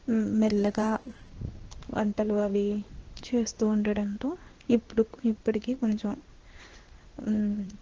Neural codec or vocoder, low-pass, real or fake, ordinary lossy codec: codec, 16 kHz in and 24 kHz out, 1 kbps, XY-Tokenizer; 7.2 kHz; fake; Opus, 16 kbps